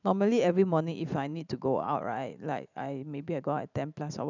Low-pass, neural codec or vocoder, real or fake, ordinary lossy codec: 7.2 kHz; vocoder, 44.1 kHz, 128 mel bands every 512 samples, BigVGAN v2; fake; none